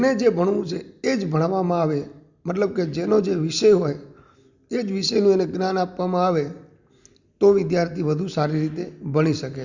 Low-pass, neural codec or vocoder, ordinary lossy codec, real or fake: 7.2 kHz; none; Opus, 64 kbps; real